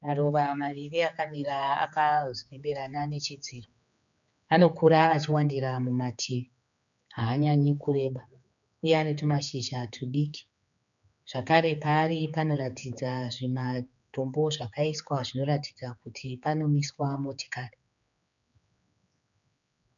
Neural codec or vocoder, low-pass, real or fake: codec, 16 kHz, 4 kbps, X-Codec, HuBERT features, trained on general audio; 7.2 kHz; fake